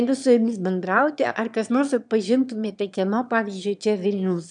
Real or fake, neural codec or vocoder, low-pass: fake; autoencoder, 22.05 kHz, a latent of 192 numbers a frame, VITS, trained on one speaker; 9.9 kHz